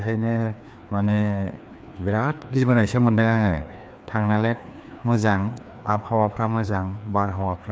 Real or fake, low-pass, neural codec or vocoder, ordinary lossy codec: fake; none; codec, 16 kHz, 2 kbps, FreqCodec, larger model; none